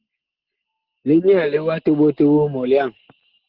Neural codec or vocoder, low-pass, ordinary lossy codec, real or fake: none; 5.4 kHz; Opus, 16 kbps; real